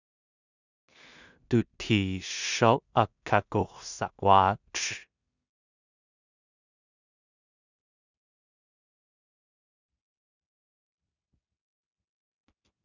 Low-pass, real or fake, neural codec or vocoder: 7.2 kHz; fake; codec, 16 kHz in and 24 kHz out, 0.4 kbps, LongCat-Audio-Codec, two codebook decoder